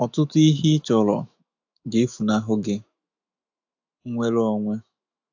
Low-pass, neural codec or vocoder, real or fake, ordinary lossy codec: 7.2 kHz; autoencoder, 48 kHz, 128 numbers a frame, DAC-VAE, trained on Japanese speech; fake; AAC, 48 kbps